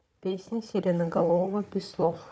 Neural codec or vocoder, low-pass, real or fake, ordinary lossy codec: codec, 16 kHz, 4 kbps, FunCodec, trained on Chinese and English, 50 frames a second; none; fake; none